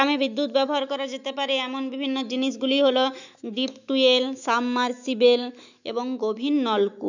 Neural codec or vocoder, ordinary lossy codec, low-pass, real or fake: autoencoder, 48 kHz, 128 numbers a frame, DAC-VAE, trained on Japanese speech; none; 7.2 kHz; fake